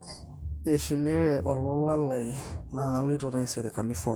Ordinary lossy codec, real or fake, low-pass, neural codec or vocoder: none; fake; none; codec, 44.1 kHz, 2.6 kbps, DAC